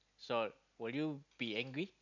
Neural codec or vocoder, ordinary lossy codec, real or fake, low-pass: none; none; real; 7.2 kHz